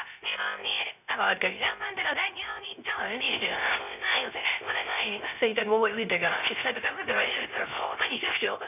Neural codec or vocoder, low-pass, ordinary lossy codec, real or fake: codec, 16 kHz, 0.3 kbps, FocalCodec; 3.6 kHz; none; fake